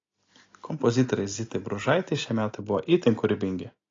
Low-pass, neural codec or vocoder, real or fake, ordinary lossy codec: 7.2 kHz; none; real; AAC, 32 kbps